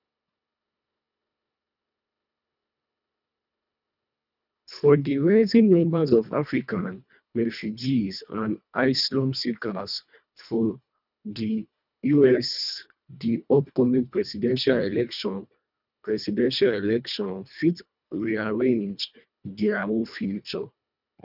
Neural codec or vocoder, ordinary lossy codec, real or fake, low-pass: codec, 24 kHz, 1.5 kbps, HILCodec; none; fake; 5.4 kHz